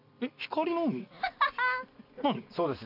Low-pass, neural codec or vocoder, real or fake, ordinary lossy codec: 5.4 kHz; none; real; none